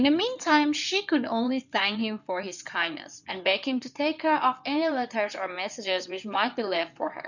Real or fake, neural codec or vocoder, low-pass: fake; codec, 16 kHz in and 24 kHz out, 2.2 kbps, FireRedTTS-2 codec; 7.2 kHz